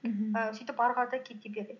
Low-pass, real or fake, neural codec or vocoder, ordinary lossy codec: 7.2 kHz; real; none; none